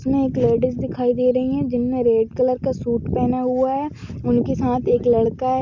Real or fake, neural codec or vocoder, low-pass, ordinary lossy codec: real; none; 7.2 kHz; none